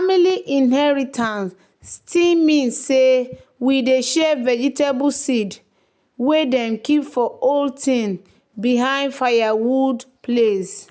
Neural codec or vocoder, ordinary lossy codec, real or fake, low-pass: none; none; real; none